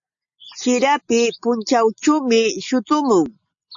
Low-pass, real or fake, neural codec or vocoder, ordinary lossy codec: 7.2 kHz; real; none; AAC, 64 kbps